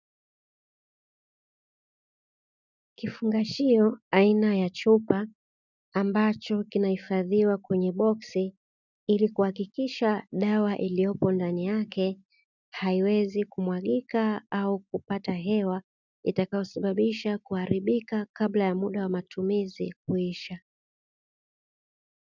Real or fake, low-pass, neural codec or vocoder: real; 7.2 kHz; none